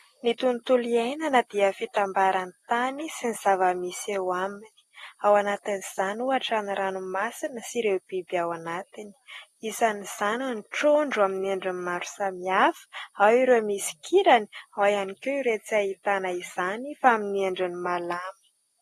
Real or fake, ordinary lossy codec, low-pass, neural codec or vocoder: real; AAC, 32 kbps; 19.8 kHz; none